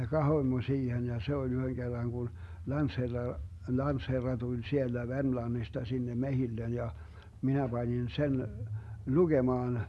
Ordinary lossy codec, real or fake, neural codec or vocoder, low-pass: none; real; none; none